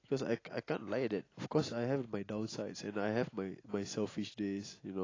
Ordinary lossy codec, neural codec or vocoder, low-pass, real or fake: AAC, 32 kbps; none; 7.2 kHz; real